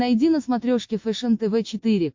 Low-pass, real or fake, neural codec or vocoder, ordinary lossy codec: 7.2 kHz; real; none; MP3, 48 kbps